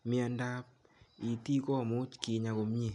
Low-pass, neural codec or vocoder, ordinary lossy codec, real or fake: 10.8 kHz; none; MP3, 96 kbps; real